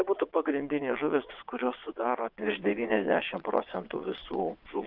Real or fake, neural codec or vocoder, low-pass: fake; vocoder, 22.05 kHz, 80 mel bands, WaveNeXt; 5.4 kHz